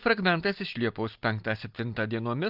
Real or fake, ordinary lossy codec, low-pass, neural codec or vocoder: real; Opus, 24 kbps; 5.4 kHz; none